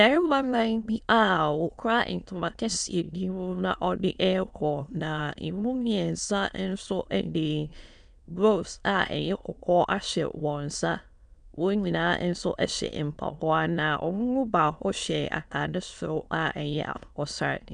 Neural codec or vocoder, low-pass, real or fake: autoencoder, 22.05 kHz, a latent of 192 numbers a frame, VITS, trained on many speakers; 9.9 kHz; fake